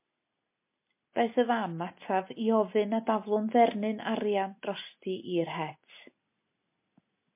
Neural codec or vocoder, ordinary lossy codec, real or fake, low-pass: none; MP3, 24 kbps; real; 3.6 kHz